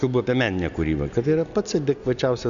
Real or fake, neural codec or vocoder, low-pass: real; none; 7.2 kHz